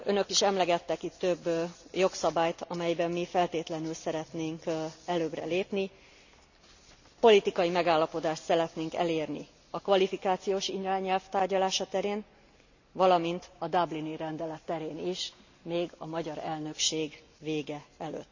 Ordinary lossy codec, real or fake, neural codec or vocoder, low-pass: none; real; none; 7.2 kHz